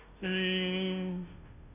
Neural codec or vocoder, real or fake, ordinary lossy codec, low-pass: codec, 16 kHz, 0.5 kbps, FunCodec, trained on Chinese and English, 25 frames a second; fake; none; 3.6 kHz